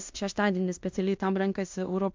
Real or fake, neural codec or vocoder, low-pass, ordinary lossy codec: fake; codec, 16 kHz in and 24 kHz out, 0.9 kbps, LongCat-Audio-Codec, fine tuned four codebook decoder; 7.2 kHz; MP3, 64 kbps